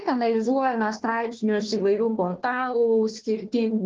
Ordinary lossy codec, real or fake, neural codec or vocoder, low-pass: Opus, 16 kbps; fake; codec, 16 kHz, 1 kbps, FunCodec, trained on Chinese and English, 50 frames a second; 7.2 kHz